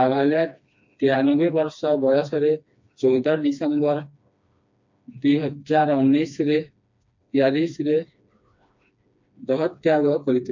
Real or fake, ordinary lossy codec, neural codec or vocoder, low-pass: fake; MP3, 48 kbps; codec, 16 kHz, 2 kbps, FreqCodec, smaller model; 7.2 kHz